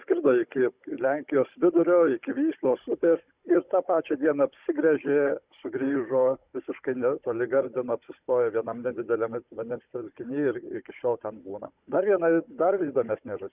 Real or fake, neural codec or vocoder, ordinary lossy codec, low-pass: fake; codec, 16 kHz, 16 kbps, FunCodec, trained on Chinese and English, 50 frames a second; Opus, 64 kbps; 3.6 kHz